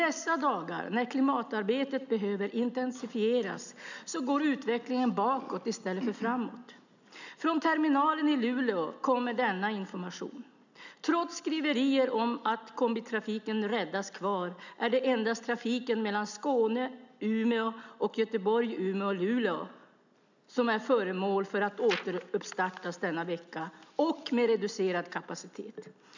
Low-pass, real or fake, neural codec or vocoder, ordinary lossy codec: 7.2 kHz; real; none; none